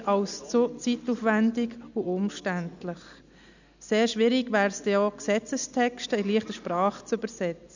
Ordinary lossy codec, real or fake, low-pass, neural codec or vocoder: none; real; 7.2 kHz; none